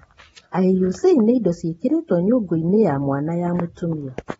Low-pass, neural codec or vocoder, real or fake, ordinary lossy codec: 19.8 kHz; none; real; AAC, 24 kbps